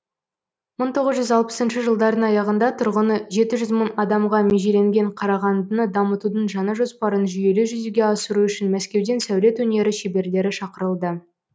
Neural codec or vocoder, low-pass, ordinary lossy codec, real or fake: none; none; none; real